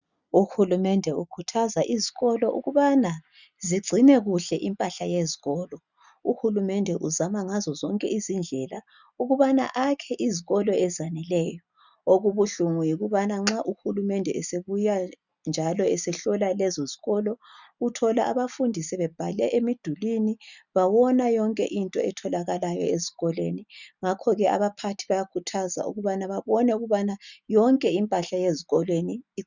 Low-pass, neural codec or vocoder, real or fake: 7.2 kHz; none; real